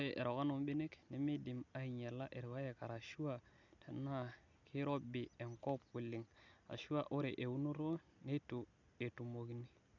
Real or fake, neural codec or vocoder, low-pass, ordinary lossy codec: real; none; 7.2 kHz; none